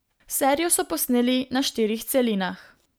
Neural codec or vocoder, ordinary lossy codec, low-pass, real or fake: none; none; none; real